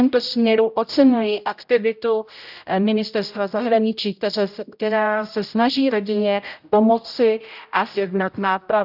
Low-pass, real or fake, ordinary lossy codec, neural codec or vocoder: 5.4 kHz; fake; none; codec, 16 kHz, 0.5 kbps, X-Codec, HuBERT features, trained on general audio